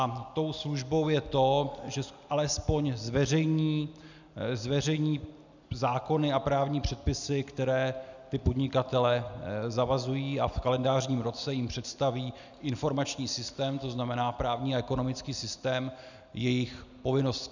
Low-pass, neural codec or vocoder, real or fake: 7.2 kHz; none; real